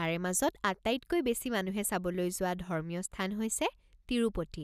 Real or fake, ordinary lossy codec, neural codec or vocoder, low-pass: real; none; none; 14.4 kHz